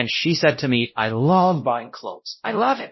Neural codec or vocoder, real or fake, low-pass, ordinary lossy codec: codec, 16 kHz, 0.5 kbps, X-Codec, WavLM features, trained on Multilingual LibriSpeech; fake; 7.2 kHz; MP3, 24 kbps